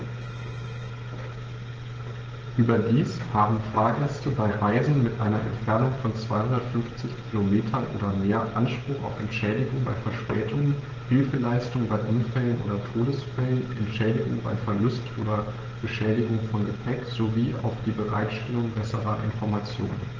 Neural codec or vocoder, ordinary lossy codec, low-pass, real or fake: codec, 16 kHz, 16 kbps, FreqCodec, smaller model; Opus, 16 kbps; 7.2 kHz; fake